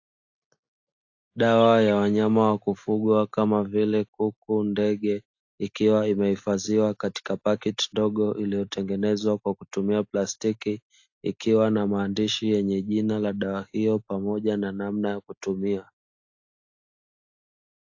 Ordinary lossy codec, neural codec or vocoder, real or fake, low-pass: AAC, 48 kbps; none; real; 7.2 kHz